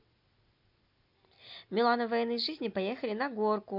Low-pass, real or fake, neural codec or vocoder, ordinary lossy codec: 5.4 kHz; real; none; none